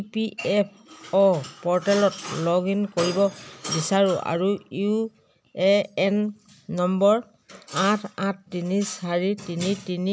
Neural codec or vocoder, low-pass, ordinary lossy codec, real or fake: none; none; none; real